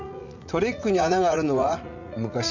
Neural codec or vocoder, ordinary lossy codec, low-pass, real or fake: vocoder, 44.1 kHz, 80 mel bands, Vocos; none; 7.2 kHz; fake